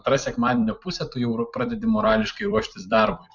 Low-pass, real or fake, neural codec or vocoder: 7.2 kHz; fake; vocoder, 44.1 kHz, 128 mel bands every 256 samples, BigVGAN v2